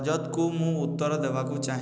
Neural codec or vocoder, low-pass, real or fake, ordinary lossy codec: none; none; real; none